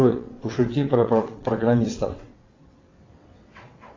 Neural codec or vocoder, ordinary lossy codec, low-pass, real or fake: vocoder, 22.05 kHz, 80 mel bands, WaveNeXt; AAC, 32 kbps; 7.2 kHz; fake